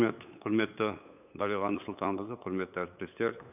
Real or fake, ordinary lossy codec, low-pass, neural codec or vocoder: fake; none; 3.6 kHz; codec, 16 kHz, 8 kbps, FunCodec, trained on LibriTTS, 25 frames a second